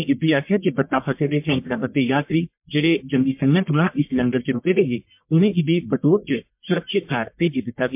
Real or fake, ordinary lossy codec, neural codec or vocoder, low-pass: fake; MP3, 32 kbps; codec, 44.1 kHz, 1.7 kbps, Pupu-Codec; 3.6 kHz